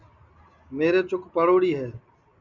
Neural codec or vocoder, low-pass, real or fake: none; 7.2 kHz; real